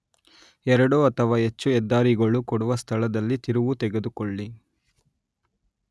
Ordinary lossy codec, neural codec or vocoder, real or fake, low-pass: none; none; real; none